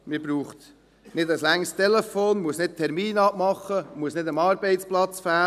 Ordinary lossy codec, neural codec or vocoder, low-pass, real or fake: none; none; 14.4 kHz; real